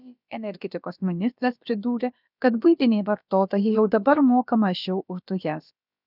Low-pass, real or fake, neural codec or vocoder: 5.4 kHz; fake; codec, 16 kHz, about 1 kbps, DyCAST, with the encoder's durations